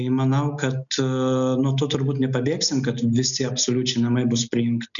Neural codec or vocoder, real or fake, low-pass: none; real; 7.2 kHz